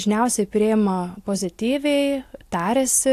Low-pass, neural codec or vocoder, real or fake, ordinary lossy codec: 14.4 kHz; none; real; AAC, 64 kbps